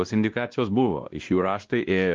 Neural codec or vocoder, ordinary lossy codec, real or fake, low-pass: codec, 16 kHz, 1 kbps, X-Codec, WavLM features, trained on Multilingual LibriSpeech; Opus, 32 kbps; fake; 7.2 kHz